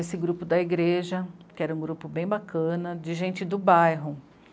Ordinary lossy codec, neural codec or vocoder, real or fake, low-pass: none; none; real; none